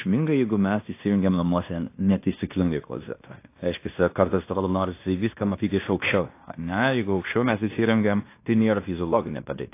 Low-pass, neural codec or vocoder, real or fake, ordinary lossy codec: 3.6 kHz; codec, 16 kHz in and 24 kHz out, 0.9 kbps, LongCat-Audio-Codec, fine tuned four codebook decoder; fake; AAC, 24 kbps